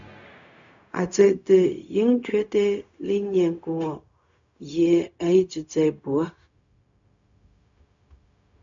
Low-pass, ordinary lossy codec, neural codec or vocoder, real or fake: 7.2 kHz; MP3, 64 kbps; codec, 16 kHz, 0.4 kbps, LongCat-Audio-Codec; fake